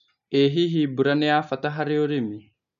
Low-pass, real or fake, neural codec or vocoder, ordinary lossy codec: 7.2 kHz; real; none; AAC, 96 kbps